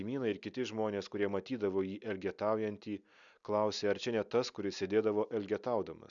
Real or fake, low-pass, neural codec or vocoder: real; 7.2 kHz; none